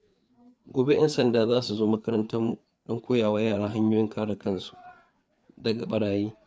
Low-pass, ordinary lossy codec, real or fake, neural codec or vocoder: none; none; fake; codec, 16 kHz, 4 kbps, FreqCodec, larger model